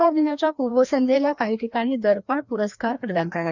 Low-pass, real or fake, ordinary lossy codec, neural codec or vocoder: 7.2 kHz; fake; none; codec, 16 kHz, 1 kbps, FreqCodec, larger model